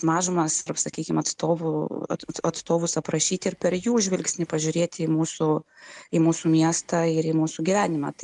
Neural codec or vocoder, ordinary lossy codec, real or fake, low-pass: none; MP3, 96 kbps; real; 10.8 kHz